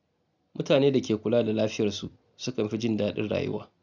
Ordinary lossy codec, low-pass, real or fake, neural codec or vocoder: none; 7.2 kHz; real; none